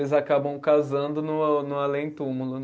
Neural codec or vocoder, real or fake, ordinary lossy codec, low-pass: none; real; none; none